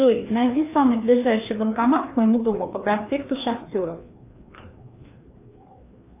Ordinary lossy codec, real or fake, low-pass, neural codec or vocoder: AAC, 24 kbps; fake; 3.6 kHz; codec, 16 kHz, 2 kbps, FreqCodec, larger model